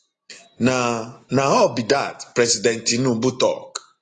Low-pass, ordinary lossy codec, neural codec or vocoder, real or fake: 9.9 kHz; AAC, 32 kbps; none; real